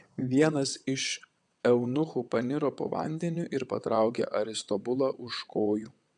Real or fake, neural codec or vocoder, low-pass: fake; vocoder, 22.05 kHz, 80 mel bands, WaveNeXt; 9.9 kHz